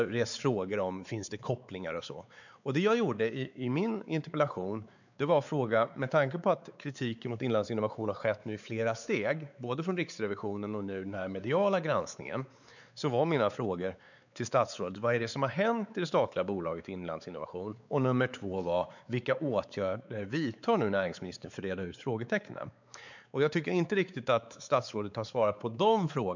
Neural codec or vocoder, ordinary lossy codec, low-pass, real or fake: codec, 16 kHz, 4 kbps, X-Codec, WavLM features, trained on Multilingual LibriSpeech; none; 7.2 kHz; fake